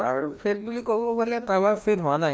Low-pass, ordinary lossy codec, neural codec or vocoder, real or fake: none; none; codec, 16 kHz, 1 kbps, FreqCodec, larger model; fake